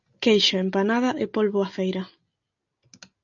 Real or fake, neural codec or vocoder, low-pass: real; none; 7.2 kHz